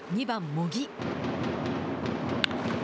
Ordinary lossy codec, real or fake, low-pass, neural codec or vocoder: none; real; none; none